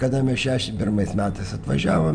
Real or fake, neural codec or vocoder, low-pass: real; none; 9.9 kHz